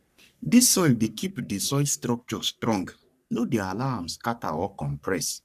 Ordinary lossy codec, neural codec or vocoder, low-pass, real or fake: none; codec, 44.1 kHz, 3.4 kbps, Pupu-Codec; 14.4 kHz; fake